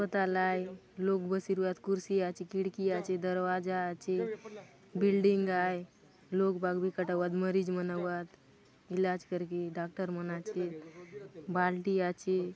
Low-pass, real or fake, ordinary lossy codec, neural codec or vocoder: none; real; none; none